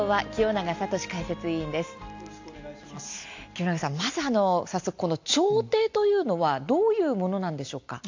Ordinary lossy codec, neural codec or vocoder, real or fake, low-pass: AAC, 48 kbps; none; real; 7.2 kHz